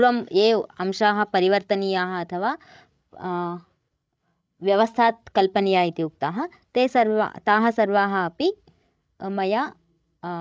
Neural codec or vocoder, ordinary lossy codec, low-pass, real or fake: codec, 16 kHz, 16 kbps, FreqCodec, larger model; none; none; fake